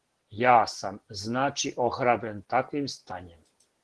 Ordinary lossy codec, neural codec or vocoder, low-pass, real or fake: Opus, 16 kbps; none; 10.8 kHz; real